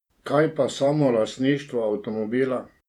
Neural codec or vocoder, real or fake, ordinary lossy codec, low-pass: vocoder, 48 kHz, 128 mel bands, Vocos; fake; none; 19.8 kHz